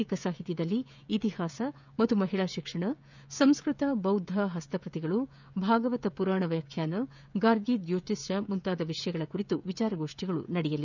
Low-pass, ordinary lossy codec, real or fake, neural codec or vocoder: 7.2 kHz; none; fake; codec, 16 kHz, 16 kbps, FreqCodec, smaller model